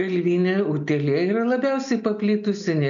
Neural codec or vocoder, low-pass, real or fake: none; 7.2 kHz; real